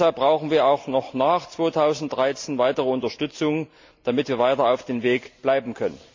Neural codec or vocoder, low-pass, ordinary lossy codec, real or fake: none; 7.2 kHz; MP3, 48 kbps; real